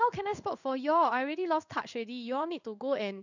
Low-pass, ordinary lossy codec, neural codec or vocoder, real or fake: 7.2 kHz; none; codec, 16 kHz in and 24 kHz out, 1 kbps, XY-Tokenizer; fake